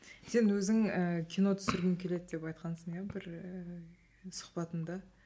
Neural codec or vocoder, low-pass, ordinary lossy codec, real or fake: none; none; none; real